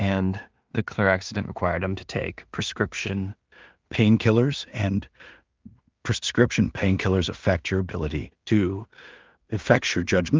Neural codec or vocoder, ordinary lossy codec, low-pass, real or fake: codec, 16 kHz in and 24 kHz out, 0.4 kbps, LongCat-Audio-Codec, two codebook decoder; Opus, 32 kbps; 7.2 kHz; fake